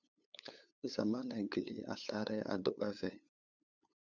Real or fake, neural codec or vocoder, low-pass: fake; codec, 16 kHz, 8 kbps, FunCodec, trained on LibriTTS, 25 frames a second; 7.2 kHz